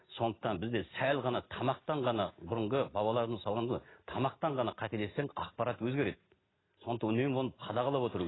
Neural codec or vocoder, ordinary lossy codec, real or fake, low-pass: none; AAC, 16 kbps; real; 7.2 kHz